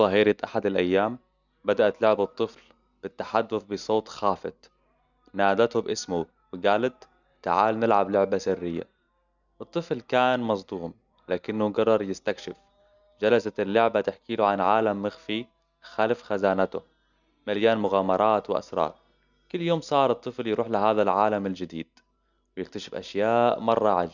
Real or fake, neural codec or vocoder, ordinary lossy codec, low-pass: real; none; none; 7.2 kHz